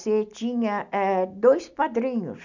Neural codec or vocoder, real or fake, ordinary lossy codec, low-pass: none; real; none; 7.2 kHz